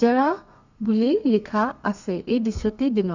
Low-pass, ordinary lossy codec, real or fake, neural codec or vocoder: 7.2 kHz; Opus, 64 kbps; fake; codec, 32 kHz, 1.9 kbps, SNAC